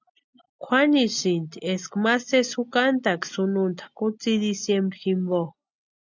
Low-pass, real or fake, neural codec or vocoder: 7.2 kHz; real; none